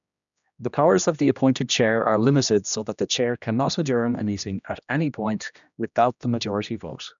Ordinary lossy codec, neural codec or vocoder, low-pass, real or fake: MP3, 96 kbps; codec, 16 kHz, 1 kbps, X-Codec, HuBERT features, trained on general audio; 7.2 kHz; fake